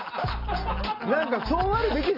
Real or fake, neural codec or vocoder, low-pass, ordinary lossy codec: real; none; 5.4 kHz; none